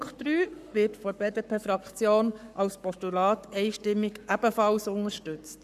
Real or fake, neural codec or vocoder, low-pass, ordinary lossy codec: fake; codec, 44.1 kHz, 7.8 kbps, Pupu-Codec; 14.4 kHz; none